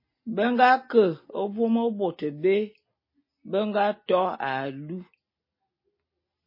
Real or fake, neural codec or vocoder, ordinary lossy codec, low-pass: real; none; MP3, 24 kbps; 5.4 kHz